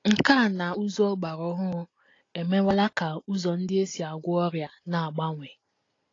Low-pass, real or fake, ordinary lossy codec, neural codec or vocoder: 7.2 kHz; real; AAC, 32 kbps; none